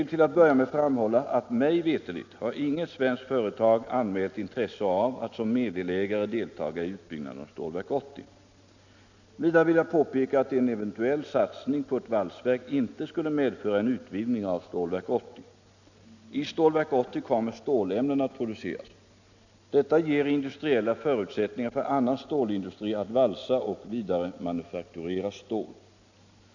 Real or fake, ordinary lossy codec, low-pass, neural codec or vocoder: real; none; 7.2 kHz; none